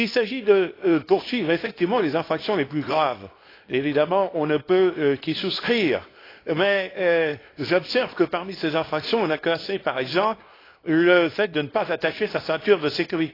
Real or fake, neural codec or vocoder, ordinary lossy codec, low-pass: fake; codec, 24 kHz, 0.9 kbps, WavTokenizer, small release; AAC, 24 kbps; 5.4 kHz